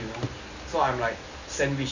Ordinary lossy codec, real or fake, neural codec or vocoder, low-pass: none; real; none; 7.2 kHz